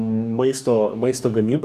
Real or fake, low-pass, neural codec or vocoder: fake; 14.4 kHz; codec, 44.1 kHz, 2.6 kbps, DAC